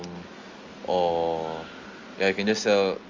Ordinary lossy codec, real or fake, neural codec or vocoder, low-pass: Opus, 32 kbps; real; none; 7.2 kHz